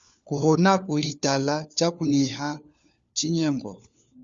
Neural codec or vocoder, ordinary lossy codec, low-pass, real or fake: codec, 16 kHz, 4 kbps, FunCodec, trained on LibriTTS, 50 frames a second; Opus, 64 kbps; 7.2 kHz; fake